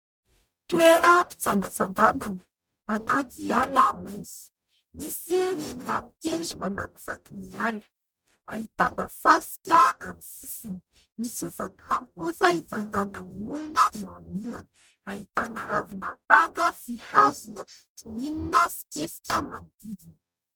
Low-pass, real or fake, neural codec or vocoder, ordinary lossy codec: 19.8 kHz; fake; codec, 44.1 kHz, 0.9 kbps, DAC; MP3, 96 kbps